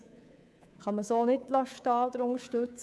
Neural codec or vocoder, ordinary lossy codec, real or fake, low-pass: codec, 24 kHz, 3.1 kbps, DualCodec; none; fake; none